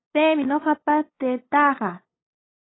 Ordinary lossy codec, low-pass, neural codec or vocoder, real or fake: AAC, 16 kbps; 7.2 kHz; codec, 16 kHz, 8 kbps, FunCodec, trained on LibriTTS, 25 frames a second; fake